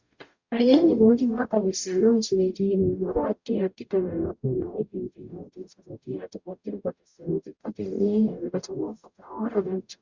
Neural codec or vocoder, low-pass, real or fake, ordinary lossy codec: codec, 44.1 kHz, 0.9 kbps, DAC; 7.2 kHz; fake; Opus, 64 kbps